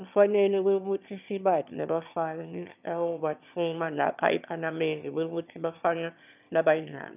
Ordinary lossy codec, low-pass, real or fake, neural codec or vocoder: none; 3.6 kHz; fake; autoencoder, 22.05 kHz, a latent of 192 numbers a frame, VITS, trained on one speaker